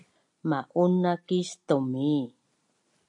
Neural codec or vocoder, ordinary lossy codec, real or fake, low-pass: none; AAC, 64 kbps; real; 10.8 kHz